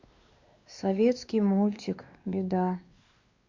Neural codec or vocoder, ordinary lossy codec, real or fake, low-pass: codec, 16 kHz, 2 kbps, X-Codec, WavLM features, trained on Multilingual LibriSpeech; Opus, 64 kbps; fake; 7.2 kHz